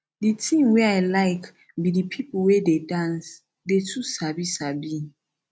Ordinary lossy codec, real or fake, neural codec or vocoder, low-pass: none; real; none; none